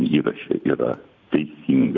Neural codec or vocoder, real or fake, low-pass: vocoder, 44.1 kHz, 128 mel bands every 512 samples, BigVGAN v2; fake; 7.2 kHz